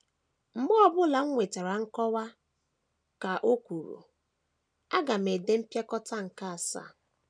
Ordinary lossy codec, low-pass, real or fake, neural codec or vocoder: none; 9.9 kHz; real; none